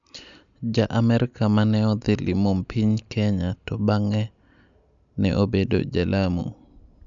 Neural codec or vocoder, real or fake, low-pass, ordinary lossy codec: none; real; 7.2 kHz; none